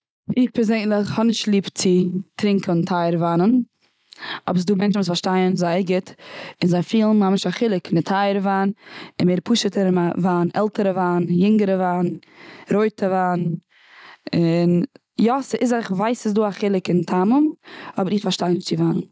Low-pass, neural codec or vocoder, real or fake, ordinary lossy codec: none; none; real; none